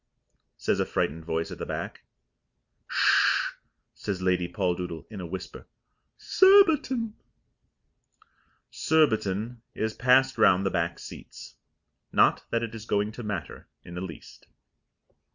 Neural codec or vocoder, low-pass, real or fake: none; 7.2 kHz; real